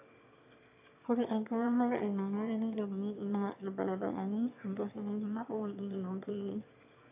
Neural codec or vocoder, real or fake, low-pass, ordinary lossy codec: autoencoder, 22.05 kHz, a latent of 192 numbers a frame, VITS, trained on one speaker; fake; 3.6 kHz; AAC, 32 kbps